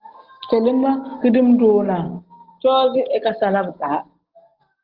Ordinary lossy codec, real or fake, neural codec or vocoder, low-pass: Opus, 16 kbps; real; none; 5.4 kHz